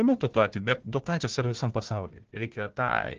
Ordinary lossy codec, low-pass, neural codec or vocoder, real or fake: Opus, 16 kbps; 7.2 kHz; codec, 16 kHz, 1 kbps, FunCodec, trained on Chinese and English, 50 frames a second; fake